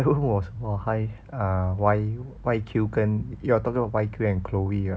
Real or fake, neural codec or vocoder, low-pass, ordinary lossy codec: real; none; none; none